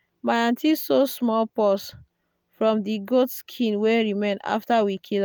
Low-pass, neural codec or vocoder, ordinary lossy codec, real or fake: none; none; none; real